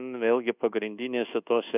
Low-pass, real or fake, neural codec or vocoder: 3.6 kHz; fake; codec, 24 kHz, 1.2 kbps, DualCodec